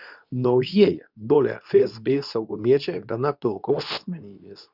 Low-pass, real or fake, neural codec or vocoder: 5.4 kHz; fake; codec, 24 kHz, 0.9 kbps, WavTokenizer, medium speech release version 2